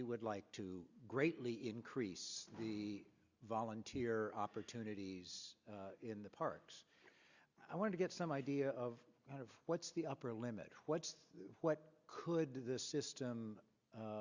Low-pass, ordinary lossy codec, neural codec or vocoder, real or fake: 7.2 kHz; Opus, 64 kbps; none; real